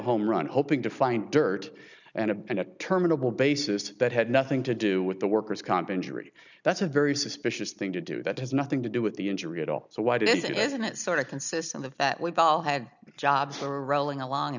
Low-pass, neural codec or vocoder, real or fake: 7.2 kHz; none; real